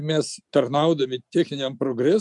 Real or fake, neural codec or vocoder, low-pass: real; none; 10.8 kHz